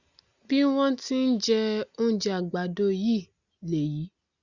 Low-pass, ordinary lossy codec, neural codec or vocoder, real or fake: 7.2 kHz; Opus, 64 kbps; none; real